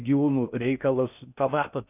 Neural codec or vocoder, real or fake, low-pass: codec, 16 kHz in and 24 kHz out, 0.6 kbps, FocalCodec, streaming, 4096 codes; fake; 3.6 kHz